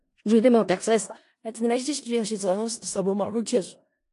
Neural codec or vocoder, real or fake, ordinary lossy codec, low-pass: codec, 16 kHz in and 24 kHz out, 0.4 kbps, LongCat-Audio-Codec, four codebook decoder; fake; AAC, 48 kbps; 10.8 kHz